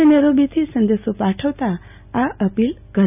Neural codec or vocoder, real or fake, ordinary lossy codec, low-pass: none; real; none; 3.6 kHz